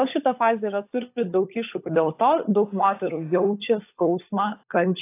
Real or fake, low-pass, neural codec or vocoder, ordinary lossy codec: fake; 3.6 kHz; codec, 16 kHz, 16 kbps, FunCodec, trained on LibriTTS, 50 frames a second; AAC, 24 kbps